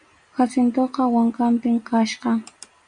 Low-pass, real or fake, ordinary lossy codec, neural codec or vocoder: 9.9 kHz; real; MP3, 96 kbps; none